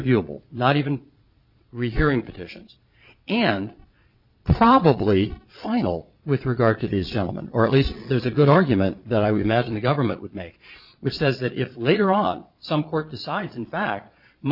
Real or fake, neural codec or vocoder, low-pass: fake; vocoder, 22.05 kHz, 80 mel bands, Vocos; 5.4 kHz